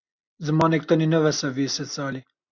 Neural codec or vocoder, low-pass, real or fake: none; 7.2 kHz; real